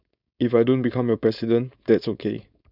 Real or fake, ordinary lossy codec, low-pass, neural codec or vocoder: fake; none; 5.4 kHz; codec, 16 kHz, 4.8 kbps, FACodec